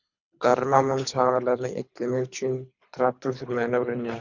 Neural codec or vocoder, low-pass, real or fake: codec, 24 kHz, 3 kbps, HILCodec; 7.2 kHz; fake